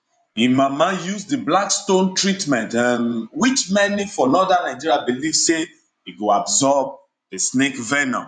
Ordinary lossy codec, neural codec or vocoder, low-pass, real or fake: none; vocoder, 24 kHz, 100 mel bands, Vocos; 9.9 kHz; fake